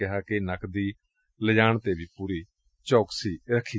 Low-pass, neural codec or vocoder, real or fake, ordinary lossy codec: none; none; real; none